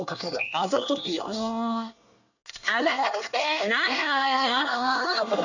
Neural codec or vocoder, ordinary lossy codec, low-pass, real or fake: codec, 24 kHz, 1 kbps, SNAC; none; 7.2 kHz; fake